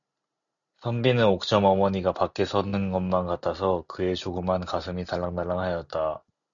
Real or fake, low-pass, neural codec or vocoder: real; 7.2 kHz; none